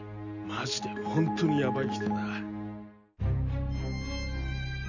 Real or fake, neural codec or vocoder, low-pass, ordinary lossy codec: real; none; 7.2 kHz; none